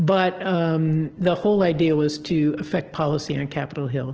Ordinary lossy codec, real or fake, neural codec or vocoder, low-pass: Opus, 16 kbps; real; none; 7.2 kHz